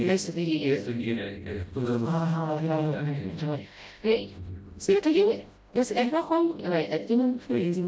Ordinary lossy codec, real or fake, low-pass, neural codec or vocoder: none; fake; none; codec, 16 kHz, 0.5 kbps, FreqCodec, smaller model